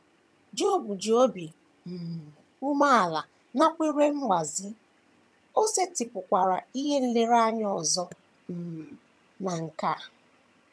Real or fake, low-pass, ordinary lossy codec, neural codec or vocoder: fake; none; none; vocoder, 22.05 kHz, 80 mel bands, HiFi-GAN